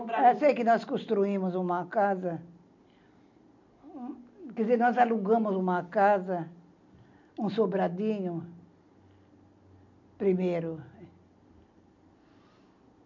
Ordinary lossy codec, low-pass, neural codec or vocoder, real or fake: MP3, 64 kbps; 7.2 kHz; none; real